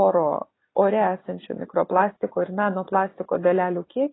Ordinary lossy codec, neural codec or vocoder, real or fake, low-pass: AAC, 16 kbps; none; real; 7.2 kHz